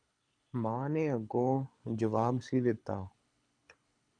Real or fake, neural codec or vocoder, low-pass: fake; codec, 24 kHz, 6 kbps, HILCodec; 9.9 kHz